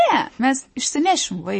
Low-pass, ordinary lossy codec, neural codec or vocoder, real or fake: 10.8 kHz; MP3, 32 kbps; none; real